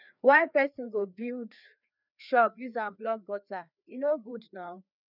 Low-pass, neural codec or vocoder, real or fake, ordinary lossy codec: 5.4 kHz; codec, 16 kHz, 2 kbps, FreqCodec, larger model; fake; none